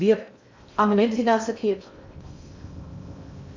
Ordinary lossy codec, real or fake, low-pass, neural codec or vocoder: MP3, 64 kbps; fake; 7.2 kHz; codec, 16 kHz in and 24 kHz out, 0.6 kbps, FocalCodec, streaming, 2048 codes